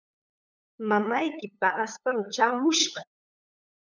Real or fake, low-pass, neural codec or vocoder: fake; 7.2 kHz; codec, 16 kHz, 8 kbps, FunCodec, trained on LibriTTS, 25 frames a second